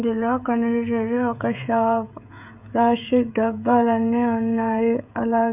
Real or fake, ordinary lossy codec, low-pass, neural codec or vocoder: fake; none; 3.6 kHz; codec, 16 kHz, 16 kbps, FreqCodec, smaller model